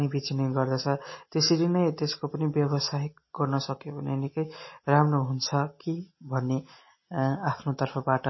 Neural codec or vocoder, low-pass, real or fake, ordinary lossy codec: none; 7.2 kHz; real; MP3, 24 kbps